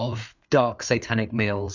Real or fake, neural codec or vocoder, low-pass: fake; codec, 16 kHz, 4 kbps, FreqCodec, larger model; 7.2 kHz